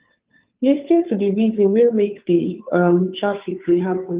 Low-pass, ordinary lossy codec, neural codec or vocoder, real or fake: 3.6 kHz; Opus, 32 kbps; codec, 16 kHz, 2 kbps, FunCodec, trained on Chinese and English, 25 frames a second; fake